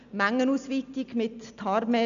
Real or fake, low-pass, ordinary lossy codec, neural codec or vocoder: real; 7.2 kHz; none; none